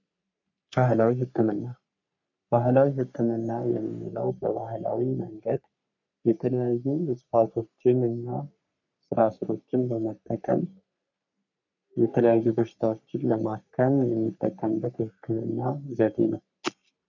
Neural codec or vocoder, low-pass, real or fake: codec, 44.1 kHz, 3.4 kbps, Pupu-Codec; 7.2 kHz; fake